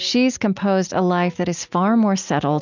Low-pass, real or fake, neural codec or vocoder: 7.2 kHz; real; none